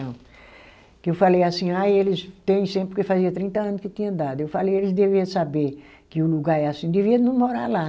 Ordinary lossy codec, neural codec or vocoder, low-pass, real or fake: none; none; none; real